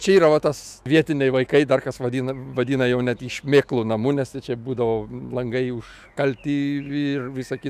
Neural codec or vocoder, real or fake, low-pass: autoencoder, 48 kHz, 128 numbers a frame, DAC-VAE, trained on Japanese speech; fake; 14.4 kHz